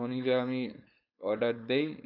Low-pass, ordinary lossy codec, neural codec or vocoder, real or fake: 5.4 kHz; AAC, 48 kbps; codec, 16 kHz, 4.8 kbps, FACodec; fake